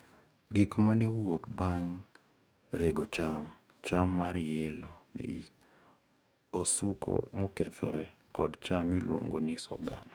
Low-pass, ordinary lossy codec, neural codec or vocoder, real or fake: none; none; codec, 44.1 kHz, 2.6 kbps, DAC; fake